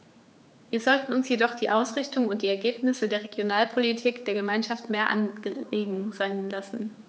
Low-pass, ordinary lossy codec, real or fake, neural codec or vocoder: none; none; fake; codec, 16 kHz, 4 kbps, X-Codec, HuBERT features, trained on general audio